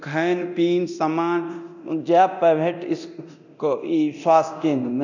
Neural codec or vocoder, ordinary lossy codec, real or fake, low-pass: codec, 24 kHz, 0.9 kbps, DualCodec; none; fake; 7.2 kHz